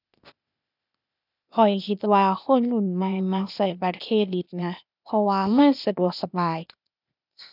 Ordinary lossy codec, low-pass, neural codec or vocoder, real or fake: none; 5.4 kHz; codec, 16 kHz, 0.8 kbps, ZipCodec; fake